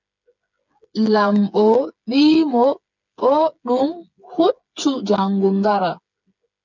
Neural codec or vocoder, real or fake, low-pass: codec, 16 kHz, 8 kbps, FreqCodec, smaller model; fake; 7.2 kHz